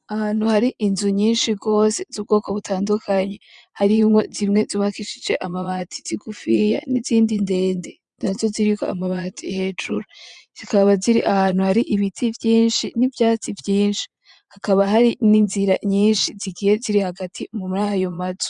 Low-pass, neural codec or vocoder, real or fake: 9.9 kHz; vocoder, 22.05 kHz, 80 mel bands, Vocos; fake